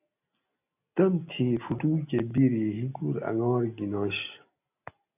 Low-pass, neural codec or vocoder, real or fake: 3.6 kHz; none; real